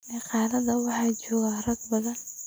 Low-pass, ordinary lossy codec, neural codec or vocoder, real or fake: none; none; none; real